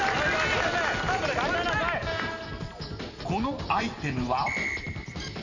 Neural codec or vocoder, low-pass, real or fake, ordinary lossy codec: none; 7.2 kHz; real; none